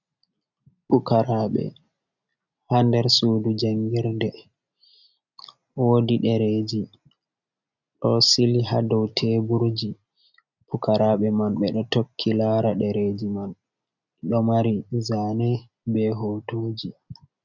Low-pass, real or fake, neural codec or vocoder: 7.2 kHz; real; none